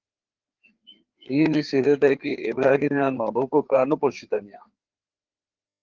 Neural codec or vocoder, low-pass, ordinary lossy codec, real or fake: codec, 16 kHz, 4 kbps, FreqCodec, larger model; 7.2 kHz; Opus, 16 kbps; fake